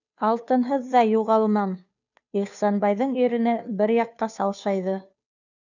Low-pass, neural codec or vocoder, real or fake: 7.2 kHz; codec, 16 kHz, 2 kbps, FunCodec, trained on Chinese and English, 25 frames a second; fake